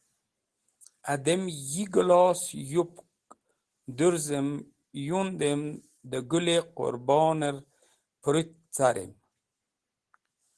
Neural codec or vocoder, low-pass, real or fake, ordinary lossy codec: none; 10.8 kHz; real; Opus, 16 kbps